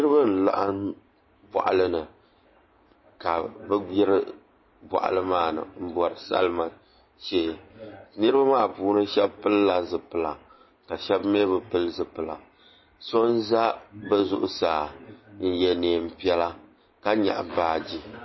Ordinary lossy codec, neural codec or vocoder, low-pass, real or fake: MP3, 24 kbps; none; 7.2 kHz; real